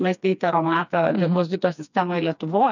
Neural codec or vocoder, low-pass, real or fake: codec, 16 kHz, 2 kbps, FreqCodec, smaller model; 7.2 kHz; fake